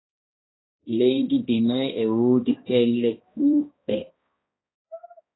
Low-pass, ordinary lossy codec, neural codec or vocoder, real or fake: 7.2 kHz; AAC, 16 kbps; codec, 16 kHz, 1 kbps, X-Codec, HuBERT features, trained on balanced general audio; fake